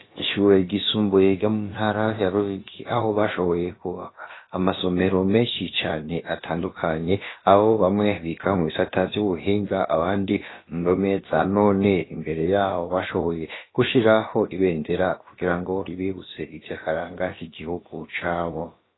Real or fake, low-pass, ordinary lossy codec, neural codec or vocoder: fake; 7.2 kHz; AAC, 16 kbps; codec, 16 kHz, about 1 kbps, DyCAST, with the encoder's durations